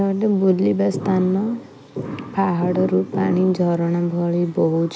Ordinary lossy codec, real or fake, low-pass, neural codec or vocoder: none; real; none; none